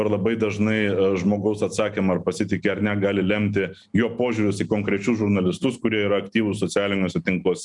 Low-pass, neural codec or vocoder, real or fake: 10.8 kHz; none; real